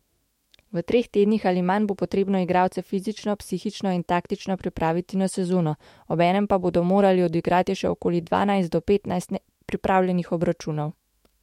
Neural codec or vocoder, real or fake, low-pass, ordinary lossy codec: autoencoder, 48 kHz, 128 numbers a frame, DAC-VAE, trained on Japanese speech; fake; 19.8 kHz; MP3, 64 kbps